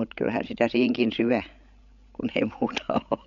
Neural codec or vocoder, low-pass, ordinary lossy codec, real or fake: codec, 16 kHz, 16 kbps, FreqCodec, larger model; 7.2 kHz; none; fake